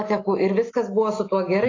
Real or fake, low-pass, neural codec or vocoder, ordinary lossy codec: real; 7.2 kHz; none; AAC, 32 kbps